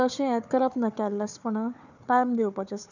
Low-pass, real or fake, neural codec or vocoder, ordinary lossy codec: 7.2 kHz; fake; codec, 16 kHz, 4 kbps, FunCodec, trained on Chinese and English, 50 frames a second; none